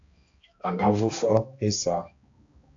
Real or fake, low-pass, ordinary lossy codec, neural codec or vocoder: fake; 7.2 kHz; AAC, 48 kbps; codec, 16 kHz, 1 kbps, X-Codec, HuBERT features, trained on balanced general audio